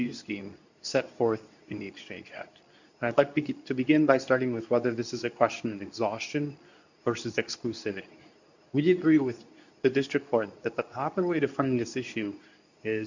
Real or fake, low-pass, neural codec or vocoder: fake; 7.2 kHz; codec, 24 kHz, 0.9 kbps, WavTokenizer, medium speech release version 2